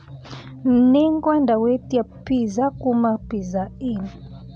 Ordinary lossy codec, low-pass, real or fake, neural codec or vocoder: MP3, 96 kbps; 9.9 kHz; real; none